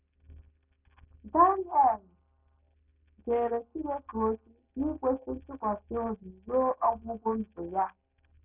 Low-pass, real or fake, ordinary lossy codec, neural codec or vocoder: 3.6 kHz; real; none; none